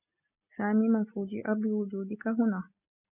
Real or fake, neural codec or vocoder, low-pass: real; none; 3.6 kHz